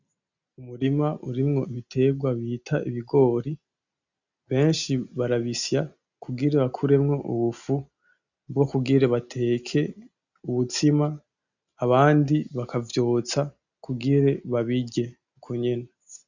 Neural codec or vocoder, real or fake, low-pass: none; real; 7.2 kHz